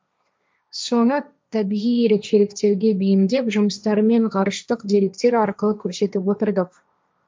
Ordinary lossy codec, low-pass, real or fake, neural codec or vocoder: none; none; fake; codec, 16 kHz, 1.1 kbps, Voila-Tokenizer